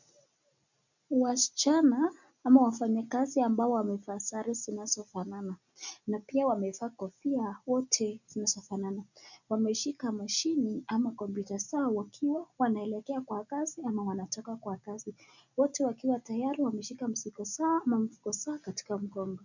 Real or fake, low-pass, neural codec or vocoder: real; 7.2 kHz; none